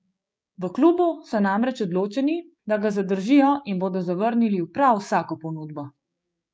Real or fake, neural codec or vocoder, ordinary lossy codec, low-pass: fake; codec, 16 kHz, 6 kbps, DAC; none; none